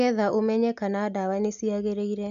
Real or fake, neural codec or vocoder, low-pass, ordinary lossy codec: real; none; 7.2 kHz; MP3, 64 kbps